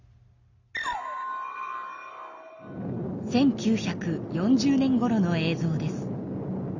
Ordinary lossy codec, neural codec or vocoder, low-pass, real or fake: Opus, 64 kbps; none; 7.2 kHz; real